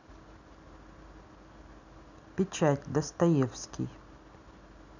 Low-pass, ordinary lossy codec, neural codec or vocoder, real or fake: 7.2 kHz; none; none; real